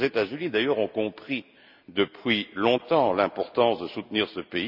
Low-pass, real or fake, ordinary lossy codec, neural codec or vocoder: 5.4 kHz; real; none; none